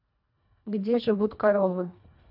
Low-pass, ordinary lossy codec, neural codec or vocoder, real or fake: 5.4 kHz; none; codec, 24 kHz, 1.5 kbps, HILCodec; fake